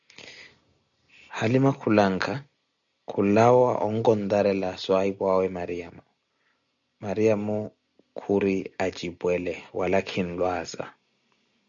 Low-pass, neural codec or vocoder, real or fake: 7.2 kHz; none; real